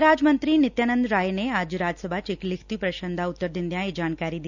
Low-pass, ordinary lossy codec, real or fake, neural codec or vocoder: 7.2 kHz; none; real; none